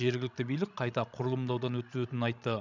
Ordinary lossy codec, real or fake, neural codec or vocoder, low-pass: none; fake; codec, 16 kHz, 16 kbps, FreqCodec, larger model; 7.2 kHz